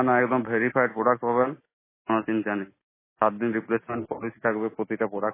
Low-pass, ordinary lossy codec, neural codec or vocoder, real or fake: 3.6 kHz; MP3, 16 kbps; none; real